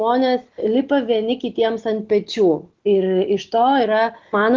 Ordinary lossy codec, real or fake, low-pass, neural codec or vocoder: Opus, 16 kbps; real; 7.2 kHz; none